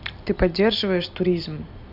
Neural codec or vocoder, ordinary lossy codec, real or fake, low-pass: none; Opus, 64 kbps; real; 5.4 kHz